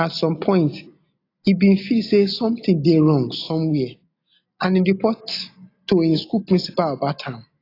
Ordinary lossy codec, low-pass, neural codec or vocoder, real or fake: AAC, 32 kbps; 5.4 kHz; none; real